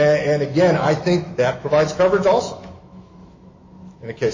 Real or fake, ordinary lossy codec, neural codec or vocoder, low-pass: fake; MP3, 32 kbps; autoencoder, 48 kHz, 128 numbers a frame, DAC-VAE, trained on Japanese speech; 7.2 kHz